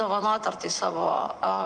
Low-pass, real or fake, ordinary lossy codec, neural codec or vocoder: 9.9 kHz; fake; Opus, 32 kbps; vocoder, 24 kHz, 100 mel bands, Vocos